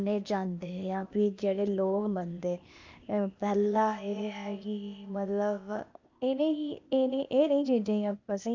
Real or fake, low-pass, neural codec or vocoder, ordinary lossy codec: fake; 7.2 kHz; codec, 16 kHz, 0.8 kbps, ZipCodec; MP3, 64 kbps